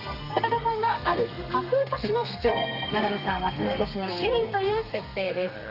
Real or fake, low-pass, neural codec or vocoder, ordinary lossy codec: fake; 5.4 kHz; codec, 32 kHz, 1.9 kbps, SNAC; none